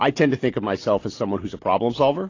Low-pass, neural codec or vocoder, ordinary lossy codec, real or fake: 7.2 kHz; none; AAC, 32 kbps; real